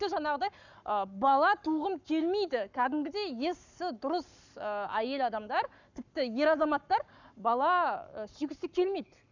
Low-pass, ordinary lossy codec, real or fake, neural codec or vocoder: 7.2 kHz; none; fake; codec, 44.1 kHz, 7.8 kbps, Pupu-Codec